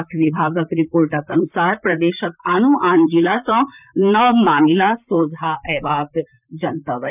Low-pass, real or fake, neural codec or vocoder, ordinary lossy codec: 3.6 kHz; fake; vocoder, 44.1 kHz, 128 mel bands, Pupu-Vocoder; none